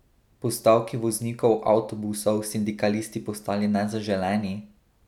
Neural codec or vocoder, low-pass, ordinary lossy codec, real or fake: none; 19.8 kHz; none; real